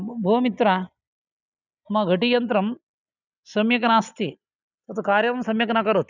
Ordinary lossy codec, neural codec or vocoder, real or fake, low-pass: none; none; real; 7.2 kHz